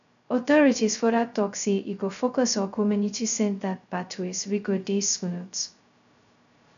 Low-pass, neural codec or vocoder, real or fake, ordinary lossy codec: 7.2 kHz; codec, 16 kHz, 0.2 kbps, FocalCodec; fake; none